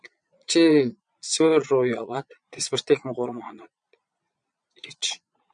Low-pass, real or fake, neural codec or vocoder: 9.9 kHz; fake; vocoder, 22.05 kHz, 80 mel bands, Vocos